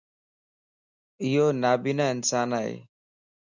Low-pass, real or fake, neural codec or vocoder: 7.2 kHz; real; none